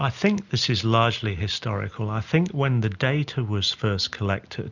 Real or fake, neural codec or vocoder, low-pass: real; none; 7.2 kHz